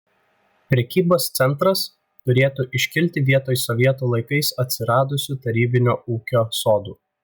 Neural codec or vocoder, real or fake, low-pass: none; real; 19.8 kHz